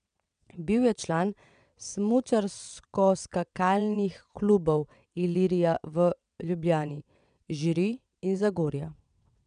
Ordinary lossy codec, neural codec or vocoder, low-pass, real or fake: none; vocoder, 22.05 kHz, 80 mel bands, WaveNeXt; 9.9 kHz; fake